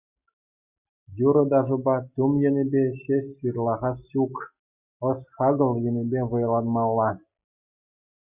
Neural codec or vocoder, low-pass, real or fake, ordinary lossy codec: none; 3.6 kHz; real; Opus, 64 kbps